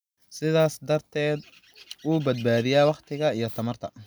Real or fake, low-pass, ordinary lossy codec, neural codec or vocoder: real; none; none; none